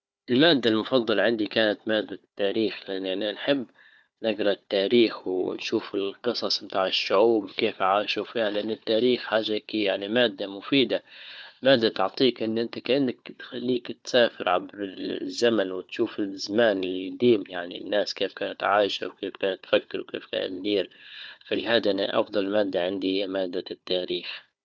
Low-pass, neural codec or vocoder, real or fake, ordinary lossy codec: none; codec, 16 kHz, 4 kbps, FunCodec, trained on Chinese and English, 50 frames a second; fake; none